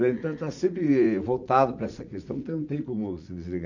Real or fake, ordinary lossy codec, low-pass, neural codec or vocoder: fake; none; 7.2 kHz; vocoder, 44.1 kHz, 80 mel bands, Vocos